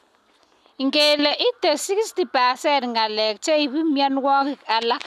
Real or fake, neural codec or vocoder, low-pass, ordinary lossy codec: real; none; 14.4 kHz; none